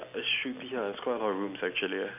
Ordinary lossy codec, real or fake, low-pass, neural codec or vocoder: none; real; 3.6 kHz; none